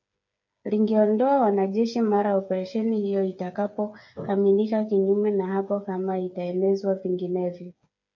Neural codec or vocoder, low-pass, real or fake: codec, 16 kHz, 8 kbps, FreqCodec, smaller model; 7.2 kHz; fake